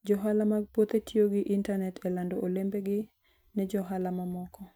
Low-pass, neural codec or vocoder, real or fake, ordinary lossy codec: none; none; real; none